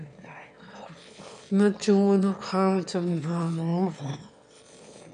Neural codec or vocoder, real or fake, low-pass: autoencoder, 22.05 kHz, a latent of 192 numbers a frame, VITS, trained on one speaker; fake; 9.9 kHz